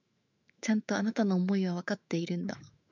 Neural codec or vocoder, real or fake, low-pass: autoencoder, 48 kHz, 128 numbers a frame, DAC-VAE, trained on Japanese speech; fake; 7.2 kHz